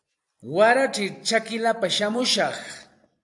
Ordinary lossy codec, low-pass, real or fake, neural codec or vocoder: AAC, 64 kbps; 10.8 kHz; fake; vocoder, 44.1 kHz, 128 mel bands every 256 samples, BigVGAN v2